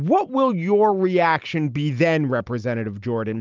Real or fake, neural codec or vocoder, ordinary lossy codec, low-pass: real; none; Opus, 24 kbps; 7.2 kHz